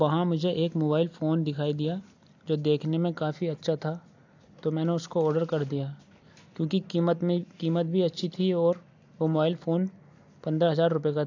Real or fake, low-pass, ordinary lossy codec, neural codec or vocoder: real; 7.2 kHz; AAC, 48 kbps; none